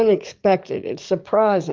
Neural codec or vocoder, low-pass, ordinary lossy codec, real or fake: codec, 44.1 kHz, 7.8 kbps, DAC; 7.2 kHz; Opus, 24 kbps; fake